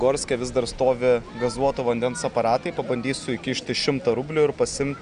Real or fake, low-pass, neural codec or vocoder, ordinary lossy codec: real; 9.9 kHz; none; Opus, 64 kbps